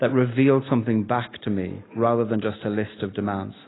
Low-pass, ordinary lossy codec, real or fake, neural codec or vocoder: 7.2 kHz; AAC, 16 kbps; real; none